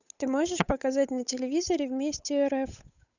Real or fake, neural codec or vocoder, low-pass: fake; codec, 16 kHz, 8 kbps, FunCodec, trained on Chinese and English, 25 frames a second; 7.2 kHz